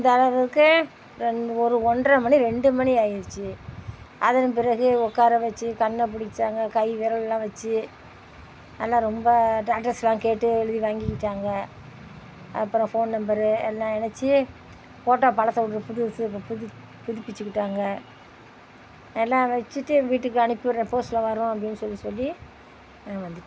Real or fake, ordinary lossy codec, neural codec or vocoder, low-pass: real; none; none; none